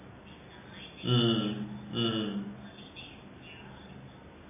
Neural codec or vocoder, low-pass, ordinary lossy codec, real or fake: none; 3.6 kHz; MP3, 16 kbps; real